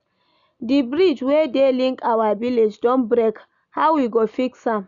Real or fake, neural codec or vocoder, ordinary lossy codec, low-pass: real; none; none; 7.2 kHz